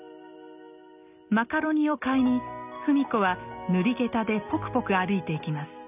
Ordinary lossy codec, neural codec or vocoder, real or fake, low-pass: none; none; real; 3.6 kHz